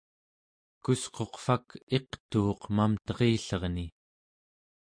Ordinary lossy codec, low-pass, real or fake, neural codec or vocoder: MP3, 48 kbps; 9.9 kHz; real; none